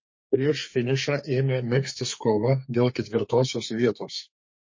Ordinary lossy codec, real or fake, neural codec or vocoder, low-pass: MP3, 32 kbps; fake; codec, 32 kHz, 1.9 kbps, SNAC; 7.2 kHz